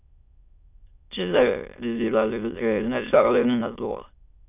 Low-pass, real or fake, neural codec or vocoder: 3.6 kHz; fake; autoencoder, 22.05 kHz, a latent of 192 numbers a frame, VITS, trained on many speakers